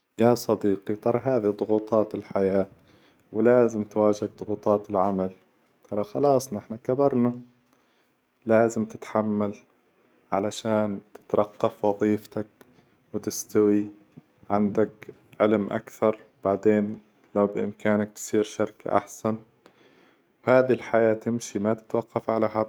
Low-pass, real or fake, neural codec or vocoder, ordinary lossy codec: none; fake; codec, 44.1 kHz, 7.8 kbps, DAC; none